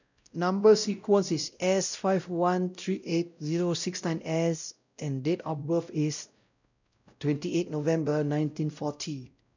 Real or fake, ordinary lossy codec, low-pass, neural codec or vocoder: fake; none; 7.2 kHz; codec, 16 kHz, 0.5 kbps, X-Codec, WavLM features, trained on Multilingual LibriSpeech